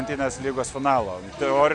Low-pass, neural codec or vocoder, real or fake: 9.9 kHz; none; real